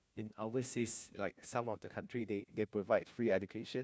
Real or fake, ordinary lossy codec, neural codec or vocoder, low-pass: fake; none; codec, 16 kHz, 1 kbps, FunCodec, trained on LibriTTS, 50 frames a second; none